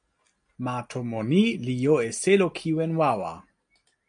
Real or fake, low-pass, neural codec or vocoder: real; 9.9 kHz; none